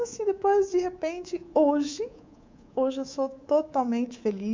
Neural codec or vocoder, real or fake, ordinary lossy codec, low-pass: codec, 24 kHz, 3.1 kbps, DualCodec; fake; MP3, 64 kbps; 7.2 kHz